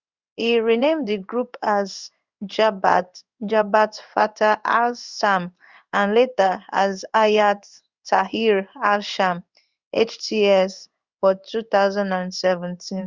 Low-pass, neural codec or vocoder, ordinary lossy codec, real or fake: 7.2 kHz; codec, 16 kHz in and 24 kHz out, 1 kbps, XY-Tokenizer; Opus, 64 kbps; fake